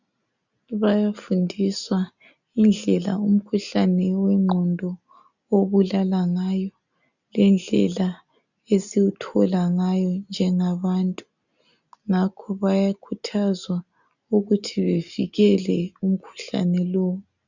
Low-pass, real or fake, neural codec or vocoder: 7.2 kHz; real; none